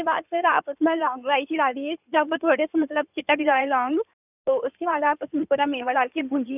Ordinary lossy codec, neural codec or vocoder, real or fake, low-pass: none; codec, 16 kHz in and 24 kHz out, 2.2 kbps, FireRedTTS-2 codec; fake; 3.6 kHz